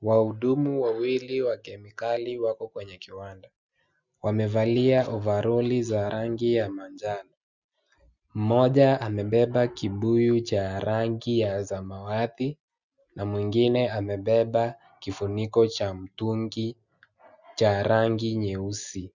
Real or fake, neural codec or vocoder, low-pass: real; none; 7.2 kHz